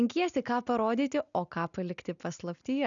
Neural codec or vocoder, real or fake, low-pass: none; real; 7.2 kHz